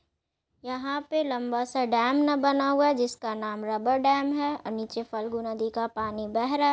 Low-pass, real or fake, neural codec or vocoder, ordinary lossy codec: none; real; none; none